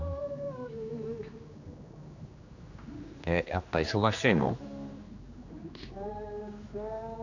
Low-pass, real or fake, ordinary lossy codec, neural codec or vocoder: 7.2 kHz; fake; none; codec, 16 kHz, 2 kbps, X-Codec, HuBERT features, trained on general audio